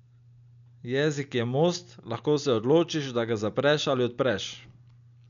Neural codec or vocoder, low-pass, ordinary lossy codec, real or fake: none; 7.2 kHz; none; real